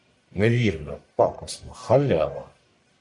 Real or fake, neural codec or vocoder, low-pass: fake; codec, 44.1 kHz, 1.7 kbps, Pupu-Codec; 10.8 kHz